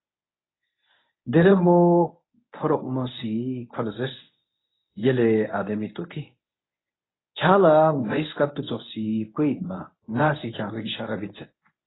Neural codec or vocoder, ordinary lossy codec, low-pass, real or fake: codec, 24 kHz, 0.9 kbps, WavTokenizer, medium speech release version 1; AAC, 16 kbps; 7.2 kHz; fake